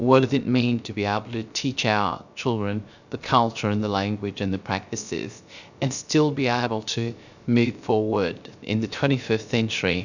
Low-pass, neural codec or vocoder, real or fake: 7.2 kHz; codec, 16 kHz, 0.3 kbps, FocalCodec; fake